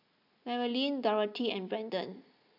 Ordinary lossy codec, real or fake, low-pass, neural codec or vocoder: none; real; 5.4 kHz; none